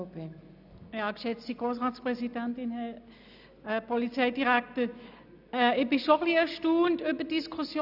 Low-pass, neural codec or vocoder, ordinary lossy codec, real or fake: 5.4 kHz; none; none; real